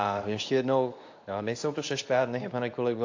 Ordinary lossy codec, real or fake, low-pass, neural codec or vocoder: AAC, 48 kbps; fake; 7.2 kHz; codec, 24 kHz, 0.9 kbps, WavTokenizer, medium speech release version 2